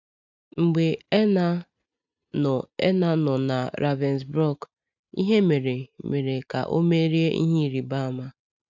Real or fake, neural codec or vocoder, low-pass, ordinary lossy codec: real; none; 7.2 kHz; none